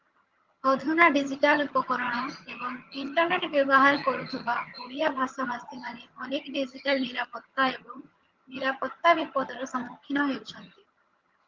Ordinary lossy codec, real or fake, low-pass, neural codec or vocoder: Opus, 32 kbps; fake; 7.2 kHz; vocoder, 22.05 kHz, 80 mel bands, HiFi-GAN